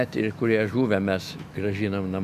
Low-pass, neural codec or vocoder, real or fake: 14.4 kHz; autoencoder, 48 kHz, 128 numbers a frame, DAC-VAE, trained on Japanese speech; fake